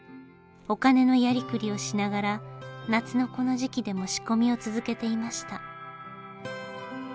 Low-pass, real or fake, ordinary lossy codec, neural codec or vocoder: none; real; none; none